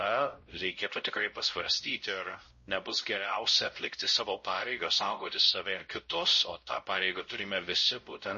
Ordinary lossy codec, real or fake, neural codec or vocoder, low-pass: MP3, 32 kbps; fake; codec, 16 kHz, 0.5 kbps, X-Codec, WavLM features, trained on Multilingual LibriSpeech; 7.2 kHz